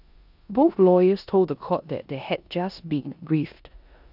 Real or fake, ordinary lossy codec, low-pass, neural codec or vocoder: fake; none; 5.4 kHz; codec, 16 kHz in and 24 kHz out, 0.9 kbps, LongCat-Audio-Codec, four codebook decoder